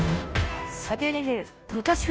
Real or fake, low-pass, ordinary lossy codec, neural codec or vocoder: fake; none; none; codec, 16 kHz, 0.5 kbps, FunCodec, trained on Chinese and English, 25 frames a second